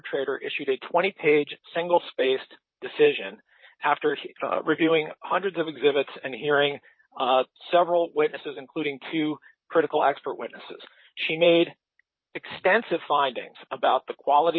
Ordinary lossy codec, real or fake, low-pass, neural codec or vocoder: MP3, 24 kbps; fake; 7.2 kHz; vocoder, 44.1 kHz, 128 mel bands, Pupu-Vocoder